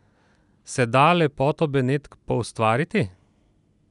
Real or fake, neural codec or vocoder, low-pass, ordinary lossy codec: real; none; 10.8 kHz; none